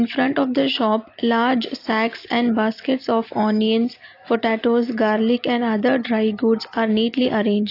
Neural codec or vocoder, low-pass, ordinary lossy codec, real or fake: none; 5.4 kHz; AAC, 32 kbps; real